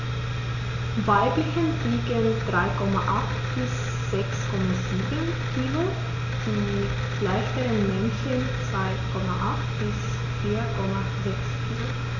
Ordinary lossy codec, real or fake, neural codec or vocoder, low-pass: none; real; none; 7.2 kHz